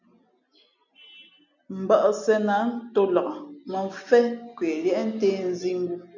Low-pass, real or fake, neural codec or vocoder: 7.2 kHz; real; none